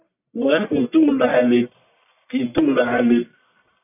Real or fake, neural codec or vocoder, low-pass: fake; codec, 44.1 kHz, 1.7 kbps, Pupu-Codec; 3.6 kHz